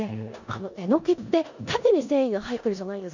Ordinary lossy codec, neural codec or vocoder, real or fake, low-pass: none; codec, 16 kHz in and 24 kHz out, 0.9 kbps, LongCat-Audio-Codec, four codebook decoder; fake; 7.2 kHz